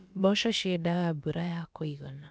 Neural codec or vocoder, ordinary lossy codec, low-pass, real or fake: codec, 16 kHz, about 1 kbps, DyCAST, with the encoder's durations; none; none; fake